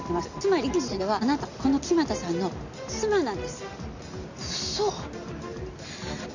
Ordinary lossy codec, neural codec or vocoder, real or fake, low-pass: none; none; real; 7.2 kHz